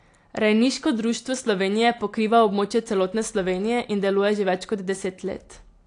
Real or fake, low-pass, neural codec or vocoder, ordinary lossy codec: real; 9.9 kHz; none; AAC, 48 kbps